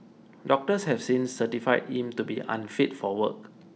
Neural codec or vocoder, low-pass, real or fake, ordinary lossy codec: none; none; real; none